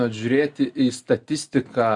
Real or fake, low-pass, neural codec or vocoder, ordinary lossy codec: real; 10.8 kHz; none; Opus, 64 kbps